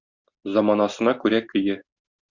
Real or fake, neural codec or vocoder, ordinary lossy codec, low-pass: real; none; Opus, 64 kbps; 7.2 kHz